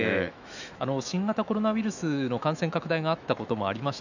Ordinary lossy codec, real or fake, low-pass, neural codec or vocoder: none; fake; 7.2 kHz; autoencoder, 48 kHz, 128 numbers a frame, DAC-VAE, trained on Japanese speech